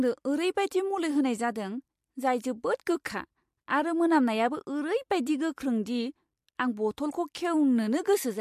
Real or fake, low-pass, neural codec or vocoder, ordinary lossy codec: real; 14.4 kHz; none; MP3, 64 kbps